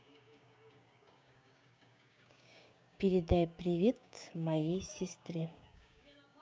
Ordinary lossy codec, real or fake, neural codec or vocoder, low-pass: none; fake; codec, 16 kHz, 6 kbps, DAC; none